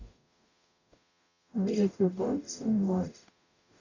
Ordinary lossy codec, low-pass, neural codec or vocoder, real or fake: none; 7.2 kHz; codec, 44.1 kHz, 0.9 kbps, DAC; fake